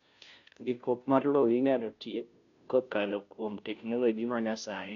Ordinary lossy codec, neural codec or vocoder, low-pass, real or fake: none; codec, 16 kHz, 0.5 kbps, FunCodec, trained on Chinese and English, 25 frames a second; 7.2 kHz; fake